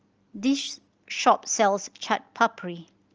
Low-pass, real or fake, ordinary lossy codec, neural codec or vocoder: 7.2 kHz; real; Opus, 24 kbps; none